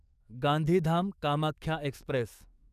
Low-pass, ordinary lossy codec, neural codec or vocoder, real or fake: 14.4 kHz; none; codec, 44.1 kHz, 7.8 kbps, DAC; fake